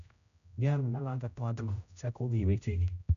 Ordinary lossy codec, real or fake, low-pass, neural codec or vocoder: none; fake; 7.2 kHz; codec, 16 kHz, 0.5 kbps, X-Codec, HuBERT features, trained on general audio